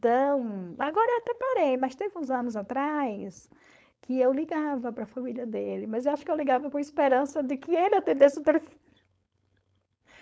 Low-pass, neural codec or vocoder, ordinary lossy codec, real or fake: none; codec, 16 kHz, 4.8 kbps, FACodec; none; fake